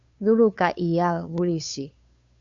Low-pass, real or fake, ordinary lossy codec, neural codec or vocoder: 7.2 kHz; fake; AAC, 64 kbps; codec, 16 kHz, 2 kbps, FunCodec, trained on Chinese and English, 25 frames a second